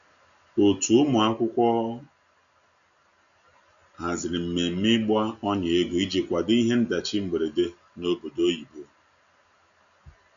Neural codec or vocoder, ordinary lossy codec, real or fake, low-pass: none; none; real; 7.2 kHz